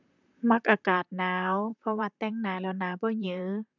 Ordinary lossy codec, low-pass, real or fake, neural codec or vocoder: none; 7.2 kHz; fake; vocoder, 44.1 kHz, 128 mel bands, Pupu-Vocoder